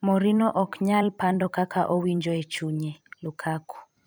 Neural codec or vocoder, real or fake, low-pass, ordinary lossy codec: vocoder, 44.1 kHz, 128 mel bands every 256 samples, BigVGAN v2; fake; none; none